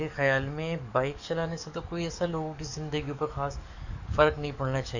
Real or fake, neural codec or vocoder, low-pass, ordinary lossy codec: fake; codec, 44.1 kHz, 7.8 kbps, DAC; 7.2 kHz; none